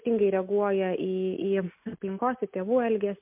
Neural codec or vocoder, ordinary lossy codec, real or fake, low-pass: none; MP3, 32 kbps; real; 3.6 kHz